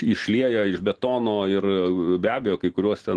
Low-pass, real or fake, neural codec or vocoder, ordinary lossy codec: 10.8 kHz; real; none; Opus, 16 kbps